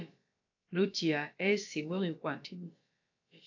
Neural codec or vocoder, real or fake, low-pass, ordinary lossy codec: codec, 16 kHz, about 1 kbps, DyCAST, with the encoder's durations; fake; 7.2 kHz; AAC, 48 kbps